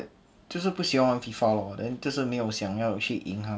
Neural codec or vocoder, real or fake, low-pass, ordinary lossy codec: none; real; none; none